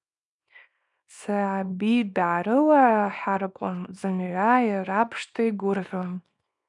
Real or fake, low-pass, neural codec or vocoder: fake; 10.8 kHz; codec, 24 kHz, 0.9 kbps, WavTokenizer, small release